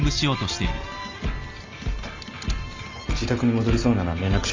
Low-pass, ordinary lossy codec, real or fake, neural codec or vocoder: 7.2 kHz; Opus, 32 kbps; real; none